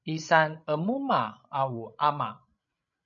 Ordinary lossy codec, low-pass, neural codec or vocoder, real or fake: MP3, 96 kbps; 7.2 kHz; codec, 16 kHz, 16 kbps, FreqCodec, larger model; fake